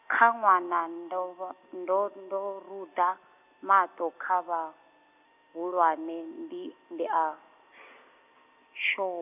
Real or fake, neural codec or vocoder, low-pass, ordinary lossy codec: real; none; 3.6 kHz; none